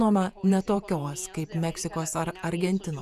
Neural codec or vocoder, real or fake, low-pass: vocoder, 48 kHz, 128 mel bands, Vocos; fake; 14.4 kHz